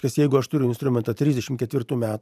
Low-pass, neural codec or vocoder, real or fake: 14.4 kHz; vocoder, 44.1 kHz, 128 mel bands every 512 samples, BigVGAN v2; fake